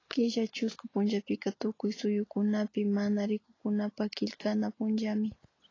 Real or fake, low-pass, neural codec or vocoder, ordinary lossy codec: real; 7.2 kHz; none; AAC, 32 kbps